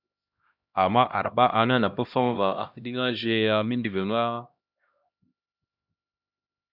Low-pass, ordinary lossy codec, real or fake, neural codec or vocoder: 5.4 kHz; Opus, 64 kbps; fake; codec, 16 kHz, 1 kbps, X-Codec, HuBERT features, trained on LibriSpeech